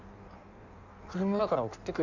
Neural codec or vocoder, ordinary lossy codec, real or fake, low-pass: codec, 16 kHz in and 24 kHz out, 1.1 kbps, FireRedTTS-2 codec; none; fake; 7.2 kHz